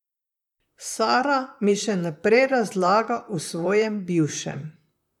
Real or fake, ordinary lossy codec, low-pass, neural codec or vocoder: fake; none; 19.8 kHz; vocoder, 44.1 kHz, 128 mel bands, Pupu-Vocoder